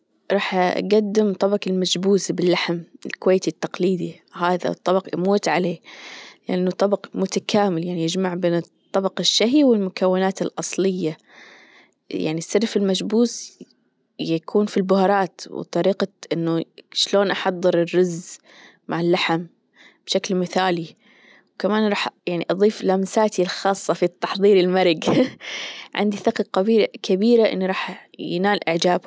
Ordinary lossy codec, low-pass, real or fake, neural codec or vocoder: none; none; real; none